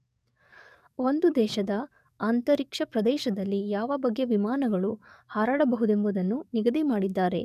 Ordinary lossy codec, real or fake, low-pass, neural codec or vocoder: none; fake; 14.4 kHz; codec, 44.1 kHz, 7.8 kbps, DAC